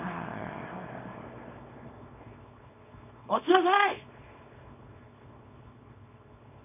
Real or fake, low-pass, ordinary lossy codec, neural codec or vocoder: fake; 3.6 kHz; none; codec, 24 kHz, 0.9 kbps, WavTokenizer, small release